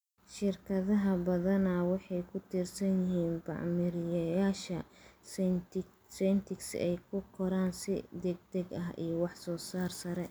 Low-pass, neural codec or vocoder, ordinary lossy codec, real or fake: none; none; none; real